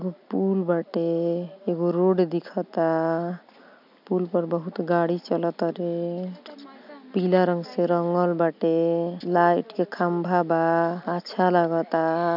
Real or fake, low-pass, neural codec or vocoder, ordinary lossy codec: real; 5.4 kHz; none; none